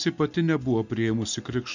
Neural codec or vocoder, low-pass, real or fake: none; 7.2 kHz; real